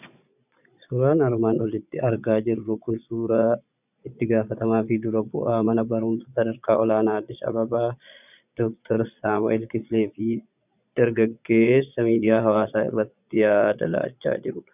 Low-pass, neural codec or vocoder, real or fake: 3.6 kHz; vocoder, 22.05 kHz, 80 mel bands, Vocos; fake